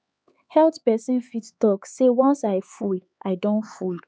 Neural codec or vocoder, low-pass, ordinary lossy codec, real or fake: codec, 16 kHz, 4 kbps, X-Codec, HuBERT features, trained on LibriSpeech; none; none; fake